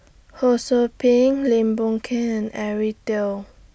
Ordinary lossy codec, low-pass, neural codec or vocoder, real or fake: none; none; none; real